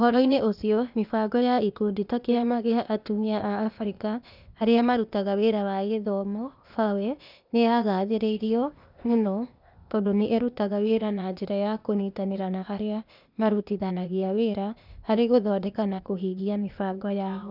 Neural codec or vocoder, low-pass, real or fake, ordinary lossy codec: codec, 16 kHz, 0.8 kbps, ZipCodec; 5.4 kHz; fake; none